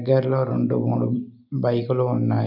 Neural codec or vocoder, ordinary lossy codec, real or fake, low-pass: none; none; real; 5.4 kHz